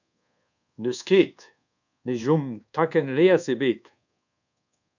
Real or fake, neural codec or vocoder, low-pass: fake; codec, 24 kHz, 1.2 kbps, DualCodec; 7.2 kHz